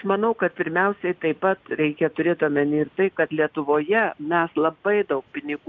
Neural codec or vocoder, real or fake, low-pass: none; real; 7.2 kHz